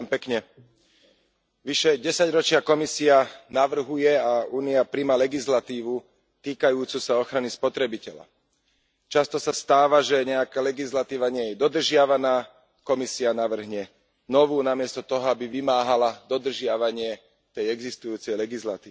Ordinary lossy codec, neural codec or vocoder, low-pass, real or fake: none; none; none; real